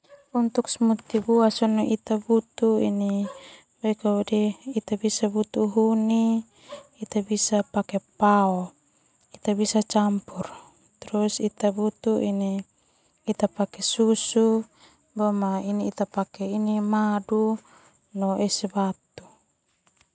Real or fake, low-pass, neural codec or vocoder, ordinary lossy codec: real; none; none; none